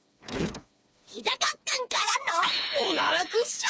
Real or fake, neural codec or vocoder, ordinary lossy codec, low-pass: fake; codec, 16 kHz, 4 kbps, FreqCodec, smaller model; none; none